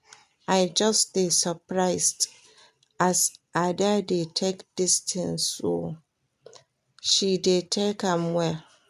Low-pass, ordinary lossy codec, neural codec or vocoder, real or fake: 14.4 kHz; MP3, 96 kbps; none; real